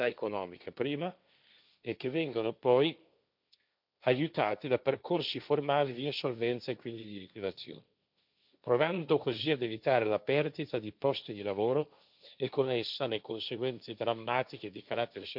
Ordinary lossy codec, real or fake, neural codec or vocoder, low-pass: none; fake; codec, 16 kHz, 1.1 kbps, Voila-Tokenizer; 5.4 kHz